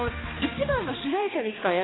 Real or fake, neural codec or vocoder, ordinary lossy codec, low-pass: fake; codec, 16 kHz, 2 kbps, X-Codec, HuBERT features, trained on balanced general audio; AAC, 16 kbps; 7.2 kHz